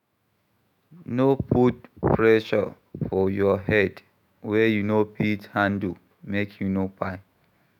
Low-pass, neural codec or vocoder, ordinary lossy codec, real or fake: 19.8 kHz; autoencoder, 48 kHz, 128 numbers a frame, DAC-VAE, trained on Japanese speech; none; fake